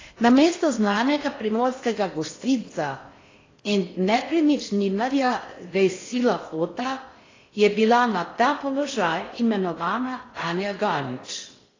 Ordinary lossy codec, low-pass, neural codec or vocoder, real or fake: AAC, 32 kbps; 7.2 kHz; codec, 16 kHz in and 24 kHz out, 0.6 kbps, FocalCodec, streaming, 4096 codes; fake